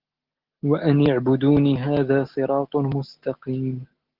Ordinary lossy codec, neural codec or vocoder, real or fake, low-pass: Opus, 16 kbps; none; real; 5.4 kHz